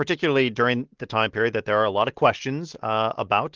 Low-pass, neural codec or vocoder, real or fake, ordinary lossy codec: 7.2 kHz; none; real; Opus, 16 kbps